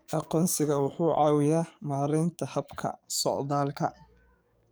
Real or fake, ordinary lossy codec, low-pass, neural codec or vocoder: fake; none; none; codec, 44.1 kHz, 7.8 kbps, DAC